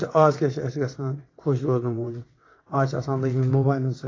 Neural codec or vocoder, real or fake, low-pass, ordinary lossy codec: vocoder, 22.05 kHz, 80 mel bands, WaveNeXt; fake; 7.2 kHz; AAC, 32 kbps